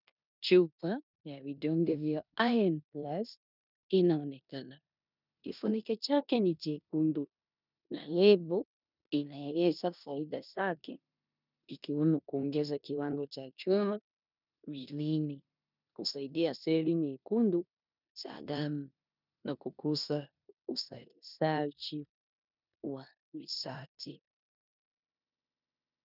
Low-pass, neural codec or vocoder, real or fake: 5.4 kHz; codec, 16 kHz in and 24 kHz out, 0.9 kbps, LongCat-Audio-Codec, four codebook decoder; fake